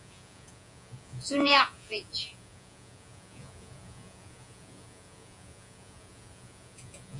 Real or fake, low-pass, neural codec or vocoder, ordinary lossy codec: fake; 10.8 kHz; vocoder, 48 kHz, 128 mel bands, Vocos; AAC, 64 kbps